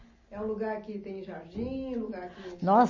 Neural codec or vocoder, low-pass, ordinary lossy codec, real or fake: none; 7.2 kHz; MP3, 32 kbps; real